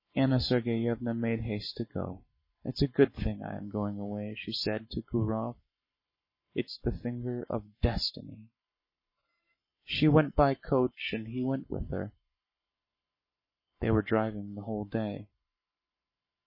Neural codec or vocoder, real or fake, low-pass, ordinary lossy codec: codec, 44.1 kHz, 7.8 kbps, Pupu-Codec; fake; 5.4 kHz; MP3, 24 kbps